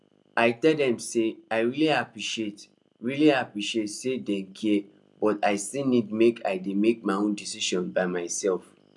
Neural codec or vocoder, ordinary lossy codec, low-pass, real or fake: none; none; none; real